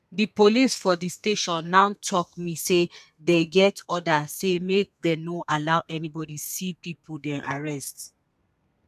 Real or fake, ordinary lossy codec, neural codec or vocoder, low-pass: fake; none; codec, 32 kHz, 1.9 kbps, SNAC; 14.4 kHz